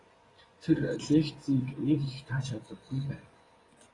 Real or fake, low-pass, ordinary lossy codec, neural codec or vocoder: fake; 10.8 kHz; AAC, 32 kbps; vocoder, 24 kHz, 100 mel bands, Vocos